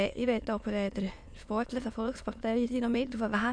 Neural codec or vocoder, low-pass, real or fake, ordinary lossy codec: autoencoder, 22.05 kHz, a latent of 192 numbers a frame, VITS, trained on many speakers; 9.9 kHz; fake; none